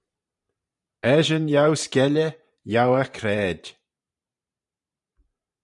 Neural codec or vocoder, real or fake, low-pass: vocoder, 44.1 kHz, 128 mel bands every 512 samples, BigVGAN v2; fake; 10.8 kHz